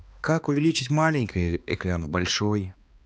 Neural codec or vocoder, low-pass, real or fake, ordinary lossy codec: codec, 16 kHz, 2 kbps, X-Codec, HuBERT features, trained on balanced general audio; none; fake; none